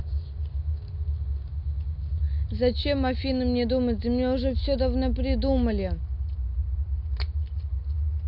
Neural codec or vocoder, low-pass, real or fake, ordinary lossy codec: none; 5.4 kHz; real; AAC, 48 kbps